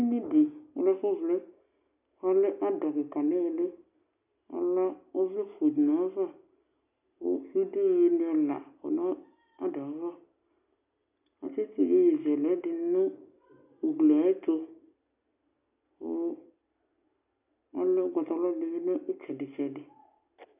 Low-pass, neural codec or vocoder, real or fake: 3.6 kHz; none; real